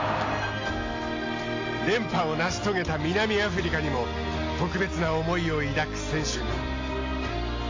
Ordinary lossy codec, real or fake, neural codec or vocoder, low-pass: AAC, 32 kbps; real; none; 7.2 kHz